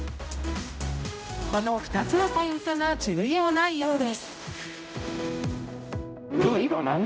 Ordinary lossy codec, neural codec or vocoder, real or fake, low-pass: none; codec, 16 kHz, 0.5 kbps, X-Codec, HuBERT features, trained on general audio; fake; none